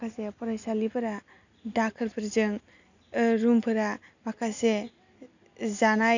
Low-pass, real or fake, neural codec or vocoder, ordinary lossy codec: 7.2 kHz; real; none; none